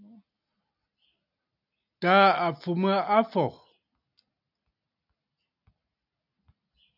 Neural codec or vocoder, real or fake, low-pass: none; real; 5.4 kHz